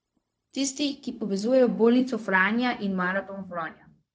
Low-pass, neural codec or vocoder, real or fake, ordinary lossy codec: none; codec, 16 kHz, 0.4 kbps, LongCat-Audio-Codec; fake; none